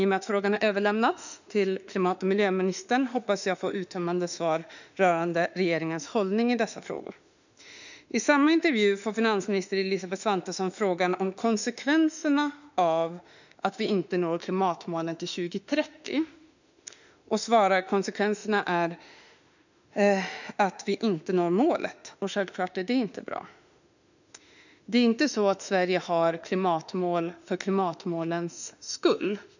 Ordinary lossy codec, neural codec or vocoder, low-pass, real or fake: none; autoencoder, 48 kHz, 32 numbers a frame, DAC-VAE, trained on Japanese speech; 7.2 kHz; fake